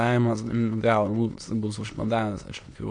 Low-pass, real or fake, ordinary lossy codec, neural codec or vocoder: 9.9 kHz; fake; MP3, 48 kbps; autoencoder, 22.05 kHz, a latent of 192 numbers a frame, VITS, trained on many speakers